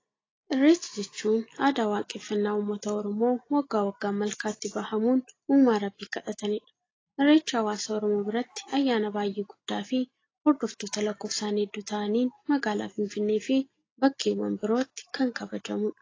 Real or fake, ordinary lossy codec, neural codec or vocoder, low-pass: real; AAC, 32 kbps; none; 7.2 kHz